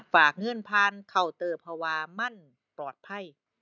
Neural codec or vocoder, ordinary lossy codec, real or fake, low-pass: none; none; real; 7.2 kHz